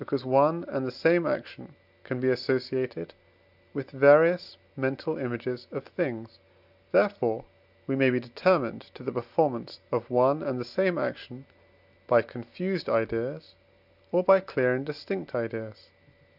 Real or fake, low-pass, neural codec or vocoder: real; 5.4 kHz; none